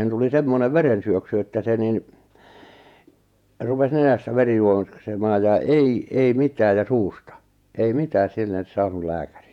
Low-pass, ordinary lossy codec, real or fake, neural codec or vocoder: 19.8 kHz; none; fake; vocoder, 48 kHz, 128 mel bands, Vocos